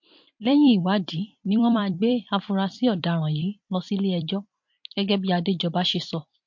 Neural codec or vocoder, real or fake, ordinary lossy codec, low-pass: vocoder, 44.1 kHz, 128 mel bands every 256 samples, BigVGAN v2; fake; MP3, 48 kbps; 7.2 kHz